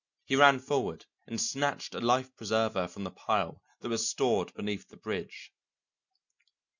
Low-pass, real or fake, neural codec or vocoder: 7.2 kHz; real; none